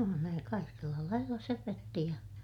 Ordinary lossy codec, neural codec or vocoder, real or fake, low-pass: none; none; real; 19.8 kHz